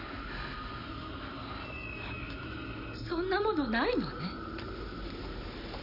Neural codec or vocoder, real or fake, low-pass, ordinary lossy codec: none; real; 5.4 kHz; none